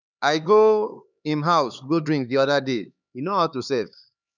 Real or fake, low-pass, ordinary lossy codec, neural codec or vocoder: fake; 7.2 kHz; none; codec, 16 kHz, 4 kbps, X-Codec, HuBERT features, trained on LibriSpeech